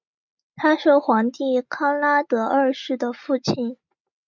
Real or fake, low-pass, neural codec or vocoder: real; 7.2 kHz; none